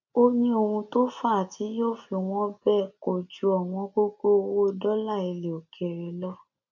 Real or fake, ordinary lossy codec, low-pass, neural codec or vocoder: real; none; 7.2 kHz; none